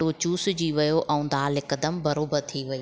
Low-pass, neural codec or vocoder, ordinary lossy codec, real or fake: none; none; none; real